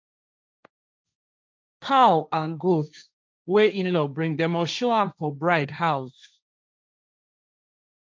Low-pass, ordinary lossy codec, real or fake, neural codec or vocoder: none; none; fake; codec, 16 kHz, 1.1 kbps, Voila-Tokenizer